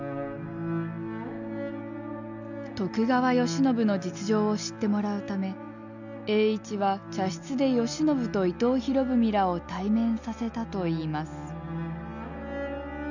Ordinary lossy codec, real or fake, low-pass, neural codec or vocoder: none; real; 7.2 kHz; none